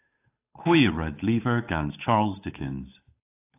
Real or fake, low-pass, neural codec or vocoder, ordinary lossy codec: fake; 3.6 kHz; codec, 16 kHz, 8 kbps, FunCodec, trained on Chinese and English, 25 frames a second; AAC, 24 kbps